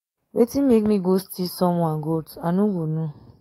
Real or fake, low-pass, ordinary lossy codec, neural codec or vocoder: fake; 19.8 kHz; AAC, 48 kbps; codec, 44.1 kHz, 7.8 kbps, DAC